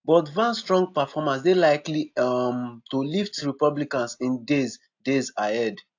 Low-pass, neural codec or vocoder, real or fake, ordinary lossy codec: 7.2 kHz; none; real; AAC, 48 kbps